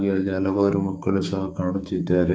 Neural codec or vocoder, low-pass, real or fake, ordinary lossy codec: codec, 16 kHz, 4 kbps, X-Codec, HuBERT features, trained on balanced general audio; none; fake; none